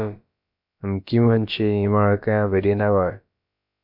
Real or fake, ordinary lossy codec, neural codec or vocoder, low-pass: fake; MP3, 48 kbps; codec, 16 kHz, about 1 kbps, DyCAST, with the encoder's durations; 5.4 kHz